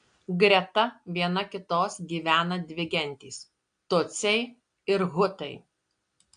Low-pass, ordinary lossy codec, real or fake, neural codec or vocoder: 9.9 kHz; AAC, 64 kbps; real; none